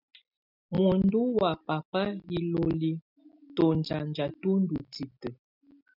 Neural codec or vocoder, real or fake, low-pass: none; real; 5.4 kHz